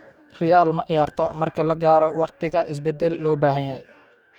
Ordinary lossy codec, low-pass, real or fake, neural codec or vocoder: none; 19.8 kHz; fake; codec, 44.1 kHz, 2.6 kbps, DAC